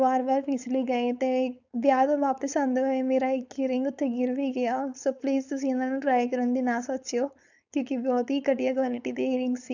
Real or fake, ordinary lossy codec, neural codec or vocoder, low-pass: fake; none; codec, 16 kHz, 4.8 kbps, FACodec; 7.2 kHz